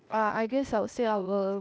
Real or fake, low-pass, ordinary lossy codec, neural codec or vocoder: fake; none; none; codec, 16 kHz, 0.8 kbps, ZipCodec